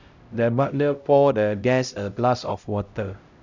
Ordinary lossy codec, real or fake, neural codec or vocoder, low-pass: none; fake; codec, 16 kHz, 0.5 kbps, X-Codec, HuBERT features, trained on LibriSpeech; 7.2 kHz